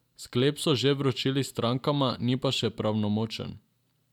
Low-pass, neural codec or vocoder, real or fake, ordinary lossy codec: 19.8 kHz; none; real; none